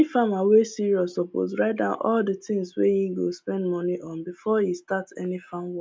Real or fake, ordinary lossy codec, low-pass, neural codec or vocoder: real; none; none; none